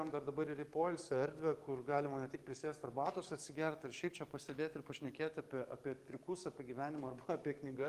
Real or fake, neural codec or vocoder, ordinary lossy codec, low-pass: fake; codec, 44.1 kHz, 7.8 kbps, DAC; Opus, 24 kbps; 14.4 kHz